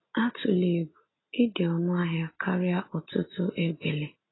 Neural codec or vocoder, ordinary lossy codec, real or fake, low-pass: none; AAC, 16 kbps; real; 7.2 kHz